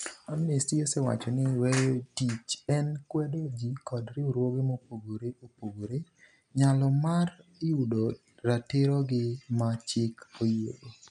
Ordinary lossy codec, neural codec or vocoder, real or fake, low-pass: none; none; real; 10.8 kHz